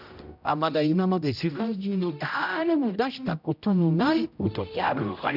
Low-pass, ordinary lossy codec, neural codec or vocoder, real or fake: 5.4 kHz; none; codec, 16 kHz, 0.5 kbps, X-Codec, HuBERT features, trained on general audio; fake